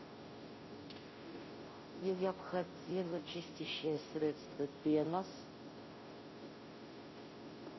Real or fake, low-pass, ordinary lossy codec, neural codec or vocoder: fake; 7.2 kHz; MP3, 24 kbps; codec, 16 kHz, 0.5 kbps, FunCodec, trained on Chinese and English, 25 frames a second